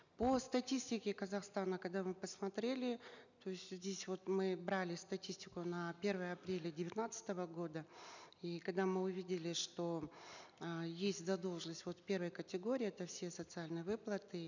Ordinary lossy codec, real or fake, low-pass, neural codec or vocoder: none; real; 7.2 kHz; none